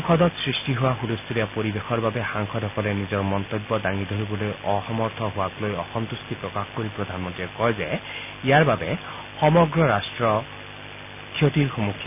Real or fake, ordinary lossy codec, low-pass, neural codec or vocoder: real; none; 3.6 kHz; none